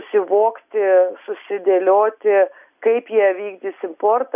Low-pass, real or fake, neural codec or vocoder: 3.6 kHz; real; none